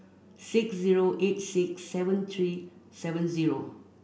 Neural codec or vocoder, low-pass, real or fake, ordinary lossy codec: none; none; real; none